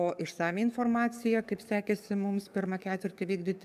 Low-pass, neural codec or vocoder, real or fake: 14.4 kHz; codec, 44.1 kHz, 7.8 kbps, DAC; fake